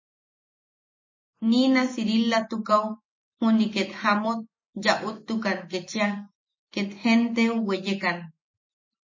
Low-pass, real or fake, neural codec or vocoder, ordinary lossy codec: 7.2 kHz; real; none; MP3, 32 kbps